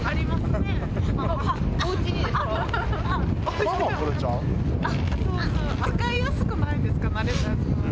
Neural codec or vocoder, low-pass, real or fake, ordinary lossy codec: none; none; real; none